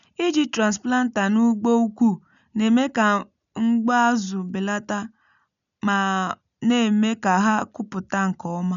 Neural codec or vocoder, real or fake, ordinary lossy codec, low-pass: none; real; none; 7.2 kHz